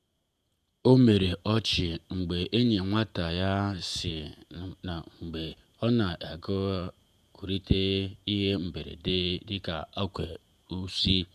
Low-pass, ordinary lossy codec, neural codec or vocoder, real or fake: 14.4 kHz; none; vocoder, 48 kHz, 128 mel bands, Vocos; fake